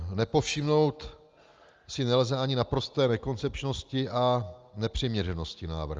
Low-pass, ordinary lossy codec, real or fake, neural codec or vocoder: 7.2 kHz; Opus, 24 kbps; real; none